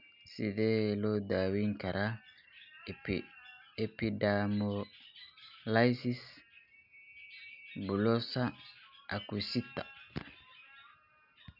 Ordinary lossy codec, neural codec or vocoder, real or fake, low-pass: none; none; real; 5.4 kHz